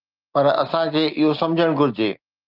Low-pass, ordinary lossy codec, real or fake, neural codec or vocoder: 5.4 kHz; Opus, 24 kbps; real; none